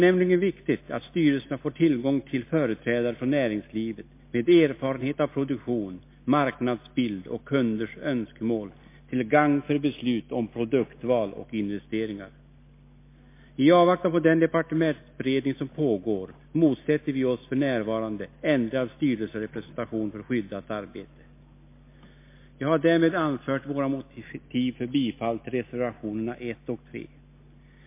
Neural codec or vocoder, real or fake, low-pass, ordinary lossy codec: none; real; 3.6 kHz; MP3, 24 kbps